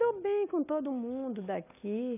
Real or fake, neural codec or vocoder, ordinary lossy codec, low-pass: real; none; none; 3.6 kHz